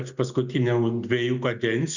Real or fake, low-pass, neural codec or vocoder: real; 7.2 kHz; none